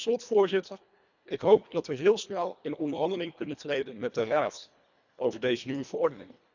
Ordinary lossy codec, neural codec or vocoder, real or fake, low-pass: none; codec, 24 kHz, 1.5 kbps, HILCodec; fake; 7.2 kHz